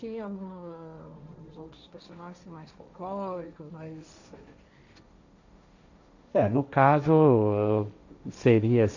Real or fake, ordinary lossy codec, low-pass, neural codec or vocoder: fake; none; 7.2 kHz; codec, 16 kHz, 1.1 kbps, Voila-Tokenizer